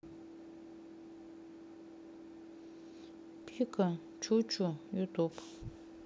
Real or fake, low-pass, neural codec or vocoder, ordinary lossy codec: real; none; none; none